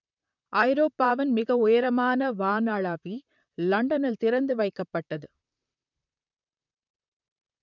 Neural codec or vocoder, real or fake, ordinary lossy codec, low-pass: vocoder, 44.1 kHz, 128 mel bands, Pupu-Vocoder; fake; none; 7.2 kHz